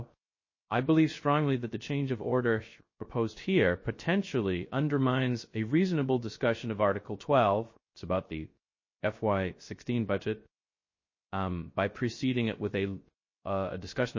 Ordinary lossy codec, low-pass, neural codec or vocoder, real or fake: MP3, 32 kbps; 7.2 kHz; codec, 16 kHz, 0.3 kbps, FocalCodec; fake